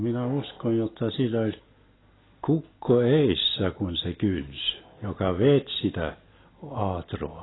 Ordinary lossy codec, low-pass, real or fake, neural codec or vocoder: AAC, 16 kbps; 7.2 kHz; real; none